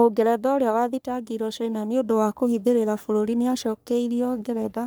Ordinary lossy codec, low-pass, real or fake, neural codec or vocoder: none; none; fake; codec, 44.1 kHz, 3.4 kbps, Pupu-Codec